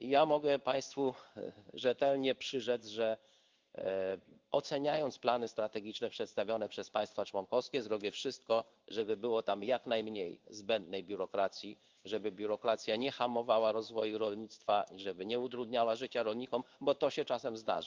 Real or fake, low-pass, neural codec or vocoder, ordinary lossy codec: fake; 7.2 kHz; codec, 16 kHz in and 24 kHz out, 1 kbps, XY-Tokenizer; Opus, 32 kbps